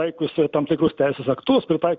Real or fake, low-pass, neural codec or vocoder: real; 7.2 kHz; none